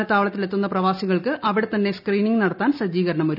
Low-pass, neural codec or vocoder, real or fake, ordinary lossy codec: 5.4 kHz; none; real; none